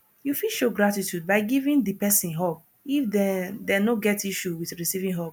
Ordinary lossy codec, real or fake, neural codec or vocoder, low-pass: none; real; none; none